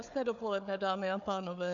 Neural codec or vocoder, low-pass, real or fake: codec, 16 kHz, 4 kbps, FunCodec, trained on Chinese and English, 50 frames a second; 7.2 kHz; fake